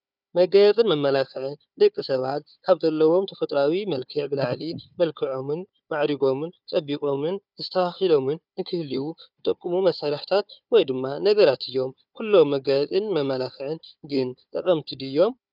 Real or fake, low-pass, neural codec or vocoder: fake; 5.4 kHz; codec, 16 kHz, 4 kbps, FunCodec, trained on Chinese and English, 50 frames a second